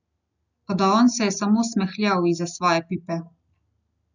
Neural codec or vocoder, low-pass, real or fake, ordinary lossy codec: none; 7.2 kHz; real; none